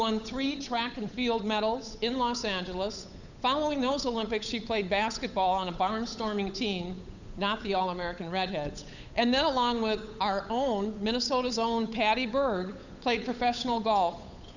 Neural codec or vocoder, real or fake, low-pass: codec, 16 kHz, 8 kbps, FunCodec, trained on Chinese and English, 25 frames a second; fake; 7.2 kHz